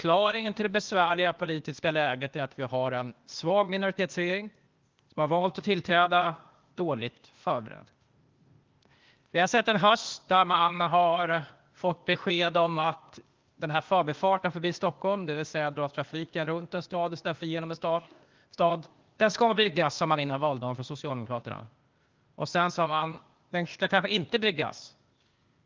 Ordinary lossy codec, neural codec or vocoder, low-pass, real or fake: Opus, 32 kbps; codec, 16 kHz, 0.8 kbps, ZipCodec; 7.2 kHz; fake